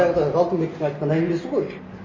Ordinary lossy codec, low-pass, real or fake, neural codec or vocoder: MP3, 32 kbps; 7.2 kHz; real; none